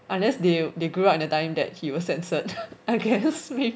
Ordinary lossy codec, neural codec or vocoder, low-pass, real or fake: none; none; none; real